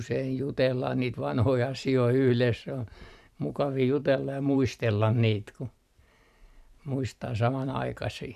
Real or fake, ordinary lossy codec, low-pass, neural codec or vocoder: fake; none; 14.4 kHz; vocoder, 44.1 kHz, 128 mel bands every 512 samples, BigVGAN v2